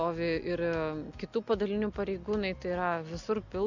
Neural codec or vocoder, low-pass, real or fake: none; 7.2 kHz; real